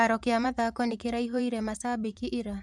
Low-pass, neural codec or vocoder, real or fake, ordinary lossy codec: none; vocoder, 24 kHz, 100 mel bands, Vocos; fake; none